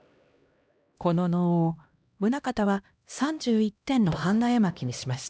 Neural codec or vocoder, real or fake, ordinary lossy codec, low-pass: codec, 16 kHz, 1 kbps, X-Codec, HuBERT features, trained on LibriSpeech; fake; none; none